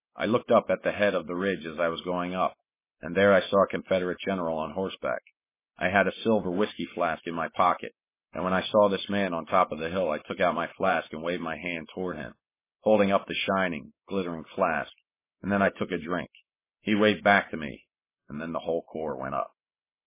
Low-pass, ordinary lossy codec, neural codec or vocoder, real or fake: 3.6 kHz; MP3, 16 kbps; none; real